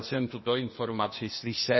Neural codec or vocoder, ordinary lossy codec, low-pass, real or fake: codec, 16 kHz, 0.8 kbps, ZipCodec; MP3, 24 kbps; 7.2 kHz; fake